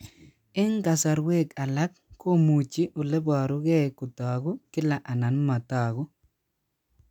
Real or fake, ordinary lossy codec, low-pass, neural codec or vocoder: real; none; 19.8 kHz; none